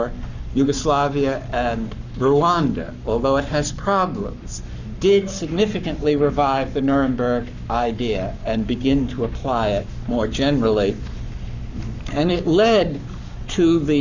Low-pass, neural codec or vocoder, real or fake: 7.2 kHz; codec, 44.1 kHz, 7.8 kbps, Pupu-Codec; fake